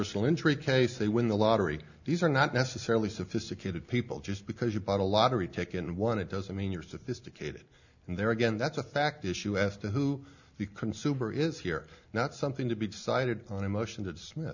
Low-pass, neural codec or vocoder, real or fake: 7.2 kHz; none; real